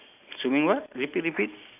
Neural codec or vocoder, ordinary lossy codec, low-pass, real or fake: none; AAC, 32 kbps; 3.6 kHz; real